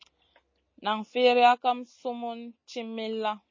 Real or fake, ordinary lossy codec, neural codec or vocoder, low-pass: real; MP3, 32 kbps; none; 7.2 kHz